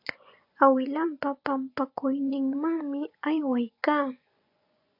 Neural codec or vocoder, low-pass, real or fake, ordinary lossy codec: vocoder, 24 kHz, 100 mel bands, Vocos; 5.4 kHz; fake; AAC, 48 kbps